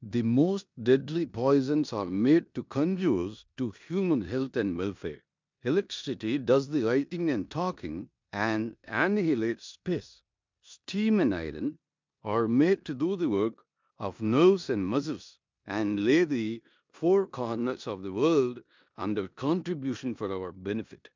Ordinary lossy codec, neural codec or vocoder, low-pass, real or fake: MP3, 64 kbps; codec, 16 kHz in and 24 kHz out, 0.9 kbps, LongCat-Audio-Codec, four codebook decoder; 7.2 kHz; fake